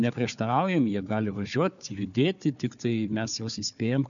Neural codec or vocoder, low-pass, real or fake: codec, 16 kHz, 4 kbps, FunCodec, trained on Chinese and English, 50 frames a second; 7.2 kHz; fake